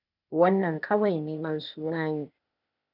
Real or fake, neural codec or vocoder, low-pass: fake; codec, 16 kHz, 0.8 kbps, ZipCodec; 5.4 kHz